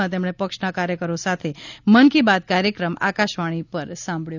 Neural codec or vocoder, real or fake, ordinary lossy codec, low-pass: none; real; none; 7.2 kHz